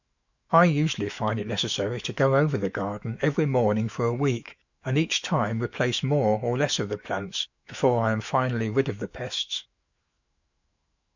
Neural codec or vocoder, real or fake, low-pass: autoencoder, 48 kHz, 128 numbers a frame, DAC-VAE, trained on Japanese speech; fake; 7.2 kHz